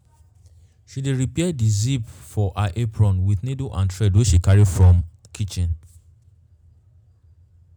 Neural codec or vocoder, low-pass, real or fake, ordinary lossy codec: none; 19.8 kHz; real; none